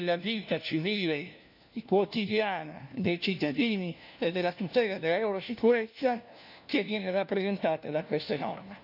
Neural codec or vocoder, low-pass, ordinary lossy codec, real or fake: codec, 16 kHz, 1 kbps, FunCodec, trained on LibriTTS, 50 frames a second; 5.4 kHz; none; fake